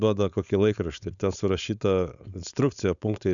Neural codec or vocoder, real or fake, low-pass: codec, 16 kHz, 4.8 kbps, FACodec; fake; 7.2 kHz